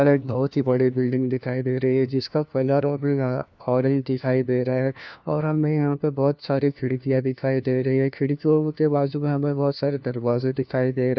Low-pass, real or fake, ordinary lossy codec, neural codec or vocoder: 7.2 kHz; fake; none; codec, 16 kHz, 1 kbps, FunCodec, trained on LibriTTS, 50 frames a second